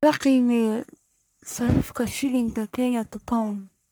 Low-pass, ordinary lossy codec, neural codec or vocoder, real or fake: none; none; codec, 44.1 kHz, 1.7 kbps, Pupu-Codec; fake